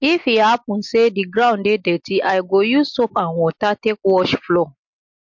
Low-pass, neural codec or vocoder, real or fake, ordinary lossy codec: 7.2 kHz; none; real; MP3, 48 kbps